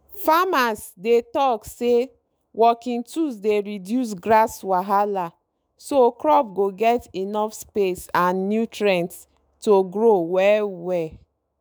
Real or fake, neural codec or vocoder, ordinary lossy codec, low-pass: fake; autoencoder, 48 kHz, 128 numbers a frame, DAC-VAE, trained on Japanese speech; none; none